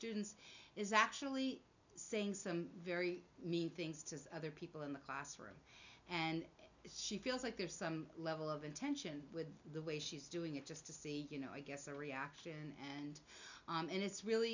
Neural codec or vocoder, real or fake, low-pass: none; real; 7.2 kHz